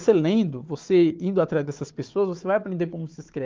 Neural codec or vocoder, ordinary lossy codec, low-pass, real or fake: codec, 16 kHz, 4 kbps, FunCodec, trained on Chinese and English, 50 frames a second; Opus, 32 kbps; 7.2 kHz; fake